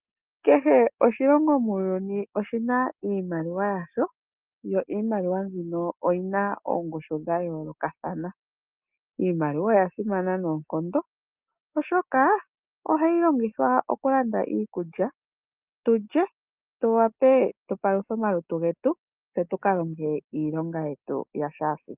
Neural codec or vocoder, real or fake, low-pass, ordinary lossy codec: none; real; 3.6 kHz; Opus, 24 kbps